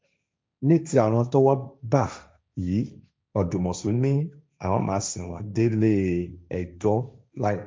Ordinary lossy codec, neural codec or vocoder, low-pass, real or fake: none; codec, 16 kHz, 1.1 kbps, Voila-Tokenizer; none; fake